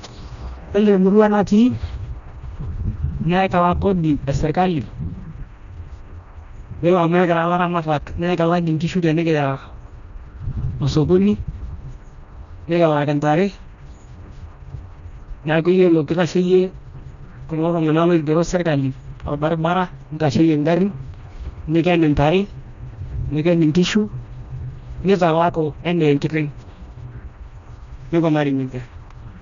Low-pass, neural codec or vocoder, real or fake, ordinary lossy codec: 7.2 kHz; codec, 16 kHz, 1 kbps, FreqCodec, smaller model; fake; none